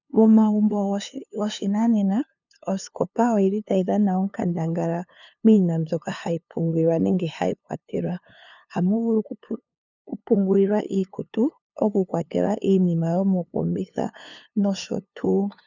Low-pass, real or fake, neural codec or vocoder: 7.2 kHz; fake; codec, 16 kHz, 2 kbps, FunCodec, trained on LibriTTS, 25 frames a second